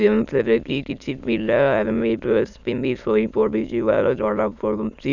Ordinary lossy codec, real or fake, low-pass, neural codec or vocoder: none; fake; 7.2 kHz; autoencoder, 22.05 kHz, a latent of 192 numbers a frame, VITS, trained on many speakers